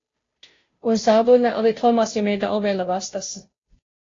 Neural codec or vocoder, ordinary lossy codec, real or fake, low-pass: codec, 16 kHz, 0.5 kbps, FunCodec, trained on Chinese and English, 25 frames a second; AAC, 32 kbps; fake; 7.2 kHz